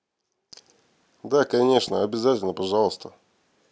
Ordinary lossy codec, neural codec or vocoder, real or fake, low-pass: none; none; real; none